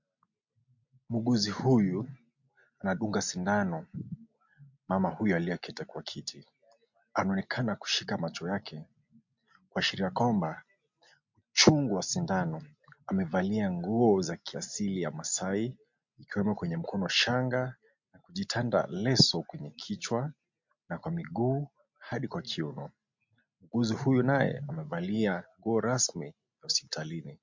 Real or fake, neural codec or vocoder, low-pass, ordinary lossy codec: real; none; 7.2 kHz; MP3, 48 kbps